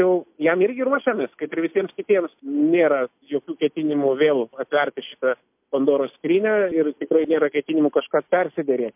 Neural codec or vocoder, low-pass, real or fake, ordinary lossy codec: none; 3.6 kHz; real; MP3, 32 kbps